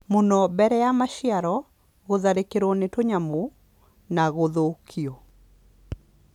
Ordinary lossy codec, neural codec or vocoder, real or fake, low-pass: none; none; real; 19.8 kHz